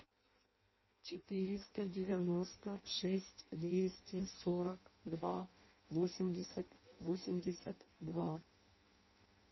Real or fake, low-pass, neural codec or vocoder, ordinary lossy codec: fake; 7.2 kHz; codec, 16 kHz in and 24 kHz out, 0.6 kbps, FireRedTTS-2 codec; MP3, 24 kbps